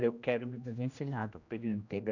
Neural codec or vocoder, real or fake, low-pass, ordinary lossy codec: codec, 16 kHz, 1 kbps, X-Codec, HuBERT features, trained on general audio; fake; 7.2 kHz; none